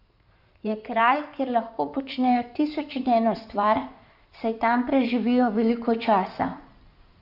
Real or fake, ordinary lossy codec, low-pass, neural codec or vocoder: fake; none; 5.4 kHz; codec, 16 kHz in and 24 kHz out, 2.2 kbps, FireRedTTS-2 codec